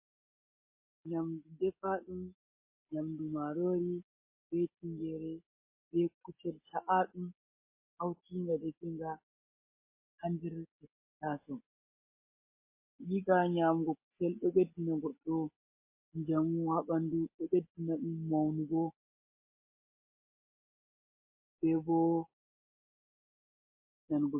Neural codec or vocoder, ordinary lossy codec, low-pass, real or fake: none; MP3, 24 kbps; 3.6 kHz; real